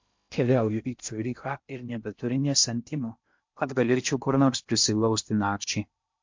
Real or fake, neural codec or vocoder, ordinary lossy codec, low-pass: fake; codec, 16 kHz in and 24 kHz out, 0.6 kbps, FocalCodec, streaming, 2048 codes; MP3, 48 kbps; 7.2 kHz